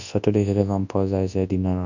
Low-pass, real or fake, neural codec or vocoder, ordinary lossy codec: 7.2 kHz; fake; codec, 24 kHz, 0.9 kbps, WavTokenizer, large speech release; none